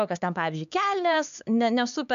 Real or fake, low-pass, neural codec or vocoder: fake; 7.2 kHz; codec, 16 kHz, 4 kbps, X-Codec, HuBERT features, trained on LibriSpeech